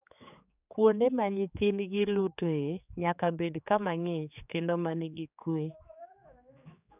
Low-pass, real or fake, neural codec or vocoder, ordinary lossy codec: 3.6 kHz; fake; codec, 16 kHz, 4 kbps, X-Codec, HuBERT features, trained on general audio; none